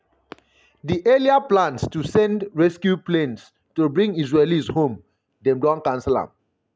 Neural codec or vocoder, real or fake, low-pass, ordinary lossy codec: none; real; none; none